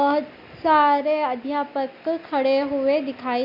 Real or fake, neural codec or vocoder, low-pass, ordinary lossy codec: real; none; 5.4 kHz; Opus, 64 kbps